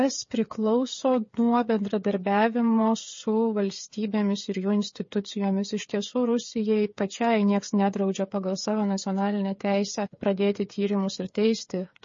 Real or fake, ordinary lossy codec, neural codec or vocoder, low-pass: fake; MP3, 32 kbps; codec, 16 kHz, 8 kbps, FreqCodec, smaller model; 7.2 kHz